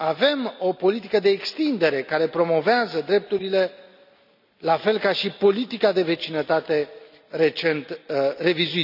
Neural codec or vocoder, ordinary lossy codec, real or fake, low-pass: none; none; real; 5.4 kHz